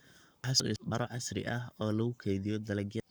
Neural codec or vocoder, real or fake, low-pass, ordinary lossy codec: codec, 44.1 kHz, 7.8 kbps, Pupu-Codec; fake; none; none